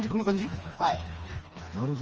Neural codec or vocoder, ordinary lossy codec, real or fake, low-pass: codec, 16 kHz, 2 kbps, FreqCodec, smaller model; Opus, 24 kbps; fake; 7.2 kHz